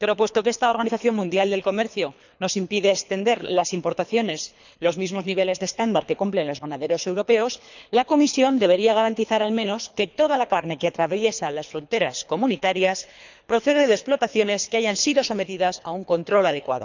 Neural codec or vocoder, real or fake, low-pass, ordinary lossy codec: codec, 24 kHz, 3 kbps, HILCodec; fake; 7.2 kHz; none